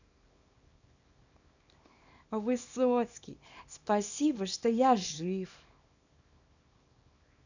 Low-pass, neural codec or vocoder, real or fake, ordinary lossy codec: 7.2 kHz; codec, 24 kHz, 0.9 kbps, WavTokenizer, small release; fake; none